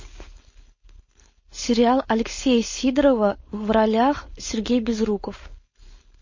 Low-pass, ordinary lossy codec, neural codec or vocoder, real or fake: 7.2 kHz; MP3, 32 kbps; codec, 16 kHz, 4.8 kbps, FACodec; fake